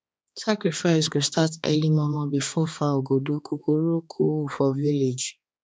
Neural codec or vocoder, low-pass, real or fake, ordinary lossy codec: codec, 16 kHz, 2 kbps, X-Codec, HuBERT features, trained on balanced general audio; none; fake; none